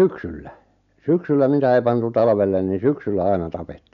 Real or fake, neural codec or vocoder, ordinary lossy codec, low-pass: real; none; MP3, 64 kbps; 7.2 kHz